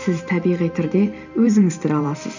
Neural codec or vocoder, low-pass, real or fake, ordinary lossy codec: vocoder, 44.1 kHz, 128 mel bands every 512 samples, BigVGAN v2; 7.2 kHz; fake; none